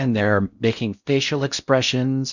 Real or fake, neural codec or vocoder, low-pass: fake; codec, 16 kHz in and 24 kHz out, 0.6 kbps, FocalCodec, streaming, 4096 codes; 7.2 kHz